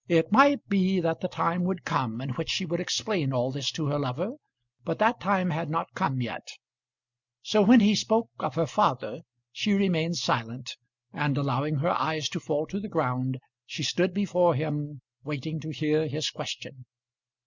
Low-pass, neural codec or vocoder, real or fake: 7.2 kHz; none; real